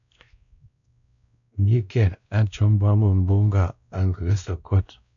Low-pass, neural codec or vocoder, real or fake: 7.2 kHz; codec, 16 kHz, 1 kbps, X-Codec, WavLM features, trained on Multilingual LibriSpeech; fake